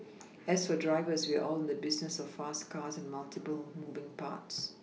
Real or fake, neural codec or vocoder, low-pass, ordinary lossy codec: real; none; none; none